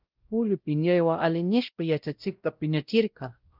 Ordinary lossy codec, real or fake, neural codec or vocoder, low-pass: Opus, 32 kbps; fake; codec, 16 kHz, 0.5 kbps, X-Codec, WavLM features, trained on Multilingual LibriSpeech; 5.4 kHz